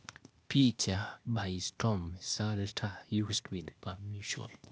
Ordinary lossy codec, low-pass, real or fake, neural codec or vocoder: none; none; fake; codec, 16 kHz, 0.8 kbps, ZipCodec